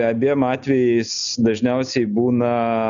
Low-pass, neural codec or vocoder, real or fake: 7.2 kHz; none; real